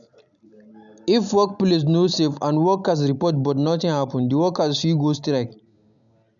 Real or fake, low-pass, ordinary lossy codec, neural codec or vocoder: real; 7.2 kHz; none; none